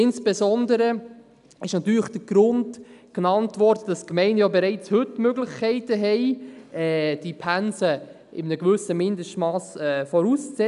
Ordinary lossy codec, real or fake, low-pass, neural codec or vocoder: none; real; 10.8 kHz; none